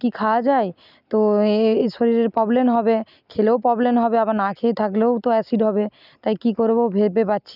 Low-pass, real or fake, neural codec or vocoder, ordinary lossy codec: 5.4 kHz; real; none; none